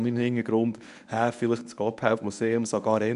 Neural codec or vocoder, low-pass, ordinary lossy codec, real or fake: codec, 24 kHz, 0.9 kbps, WavTokenizer, medium speech release version 1; 10.8 kHz; none; fake